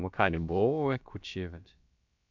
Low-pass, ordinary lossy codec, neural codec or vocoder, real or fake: 7.2 kHz; MP3, 64 kbps; codec, 16 kHz, about 1 kbps, DyCAST, with the encoder's durations; fake